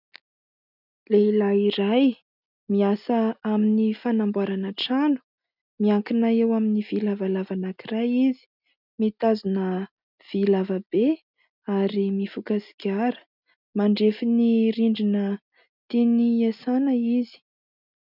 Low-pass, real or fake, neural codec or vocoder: 5.4 kHz; real; none